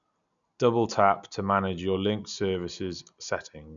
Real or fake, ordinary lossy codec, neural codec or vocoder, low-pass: real; none; none; 7.2 kHz